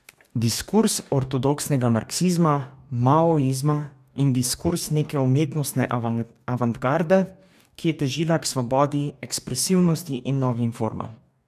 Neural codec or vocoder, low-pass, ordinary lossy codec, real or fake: codec, 44.1 kHz, 2.6 kbps, DAC; 14.4 kHz; none; fake